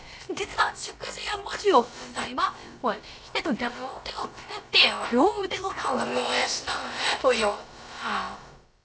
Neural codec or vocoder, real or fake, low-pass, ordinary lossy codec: codec, 16 kHz, about 1 kbps, DyCAST, with the encoder's durations; fake; none; none